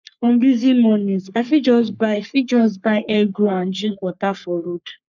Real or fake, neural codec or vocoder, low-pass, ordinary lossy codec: fake; codec, 44.1 kHz, 3.4 kbps, Pupu-Codec; 7.2 kHz; none